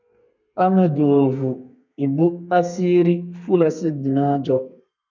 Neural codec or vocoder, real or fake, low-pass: codec, 32 kHz, 1.9 kbps, SNAC; fake; 7.2 kHz